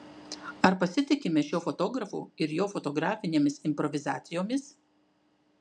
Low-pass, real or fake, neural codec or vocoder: 9.9 kHz; real; none